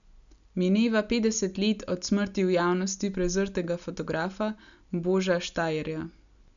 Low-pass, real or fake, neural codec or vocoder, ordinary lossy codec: 7.2 kHz; real; none; none